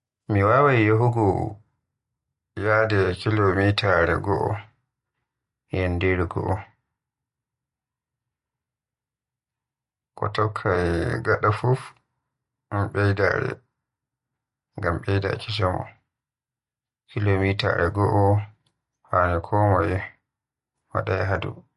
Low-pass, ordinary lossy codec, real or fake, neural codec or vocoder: 14.4 kHz; MP3, 48 kbps; real; none